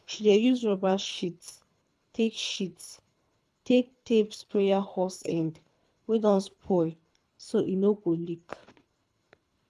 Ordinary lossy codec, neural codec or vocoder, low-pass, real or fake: none; codec, 24 kHz, 3 kbps, HILCodec; none; fake